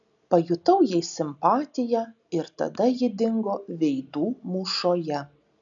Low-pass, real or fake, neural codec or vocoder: 7.2 kHz; real; none